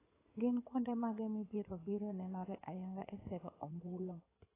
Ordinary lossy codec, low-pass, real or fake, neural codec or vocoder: AAC, 16 kbps; 3.6 kHz; fake; codec, 16 kHz in and 24 kHz out, 2.2 kbps, FireRedTTS-2 codec